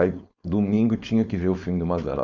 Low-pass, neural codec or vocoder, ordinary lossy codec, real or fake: 7.2 kHz; codec, 16 kHz, 4.8 kbps, FACodec; Opus, 64 kbps; fake